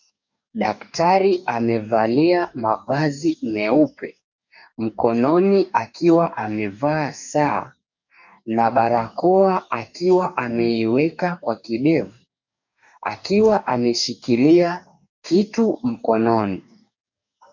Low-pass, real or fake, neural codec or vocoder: 7.2 kHz; fake; codec, 44.1 kHz, 2.6 kbps, DAC